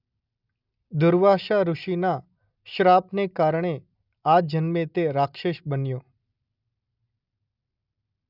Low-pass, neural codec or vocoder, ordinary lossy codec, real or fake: 5.4 kHz; none; none; real